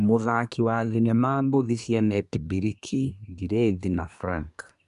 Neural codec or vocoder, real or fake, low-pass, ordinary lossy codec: codec, 24 kHz, 1 kbps, SNAC; fake; 10.8 kHz; none